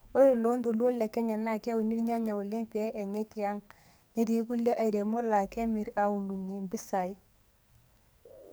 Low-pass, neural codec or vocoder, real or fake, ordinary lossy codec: none; codec, 44.1 kHz, 2.6 kbps, SNAC; fake; none